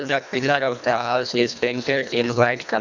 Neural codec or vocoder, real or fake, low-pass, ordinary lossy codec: codec, 24 kHz, 1.5 kbps, HILCodec; fake; 7.2 kHz; none